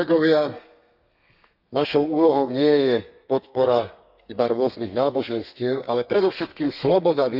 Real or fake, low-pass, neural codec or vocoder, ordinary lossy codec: fake; 5.4 kHz; codec, 32 kHz, 1.9 kbps, SNAC; none